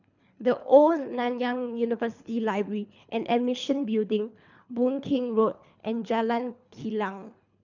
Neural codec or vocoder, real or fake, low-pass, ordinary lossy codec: codec, 24 kHz, 3 kbps, HILCodec; fake; 7.2 kHz; none